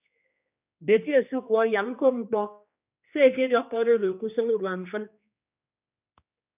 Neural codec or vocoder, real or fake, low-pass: codec, 16 kHz, 2 kbps, X-Codec, HuBERT features, trained on general audio; fake; 3.6 kHz